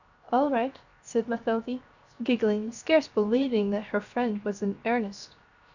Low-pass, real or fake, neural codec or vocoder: 7.2 kHz; fake; codec, 16 kHz, 0.7 kbps, FocalCodec